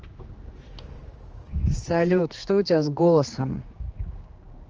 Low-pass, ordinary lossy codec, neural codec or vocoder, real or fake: 7.2 kHz; Opus, 24 kbps; codec, 16 kHz, 2 kbps, X-Codec, HuBERT features, trained on general audio; fake